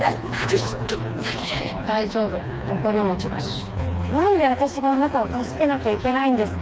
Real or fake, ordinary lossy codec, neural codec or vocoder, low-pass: fake; none; codec, 16 kHz, 2 kbps, FreqCodec, smaller model; none